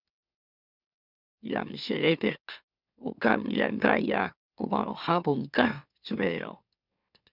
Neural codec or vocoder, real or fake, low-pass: autoencoder, 44.1 kHz, a latent of 192 numbers a frame, MeloTTS; fake; 5.4 kHz